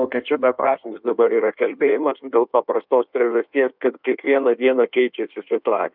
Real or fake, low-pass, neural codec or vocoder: fake; 5.4 kHz; codec, 16 kHz in and 24 kHz out, 1.1 kbps, FireRedTTS-2 codec